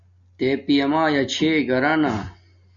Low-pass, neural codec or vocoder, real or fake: 7.2 kHz; none; real